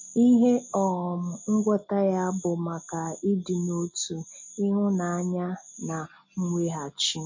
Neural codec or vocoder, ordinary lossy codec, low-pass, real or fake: none; MP3, 32 kbps; 7.2 kHz; real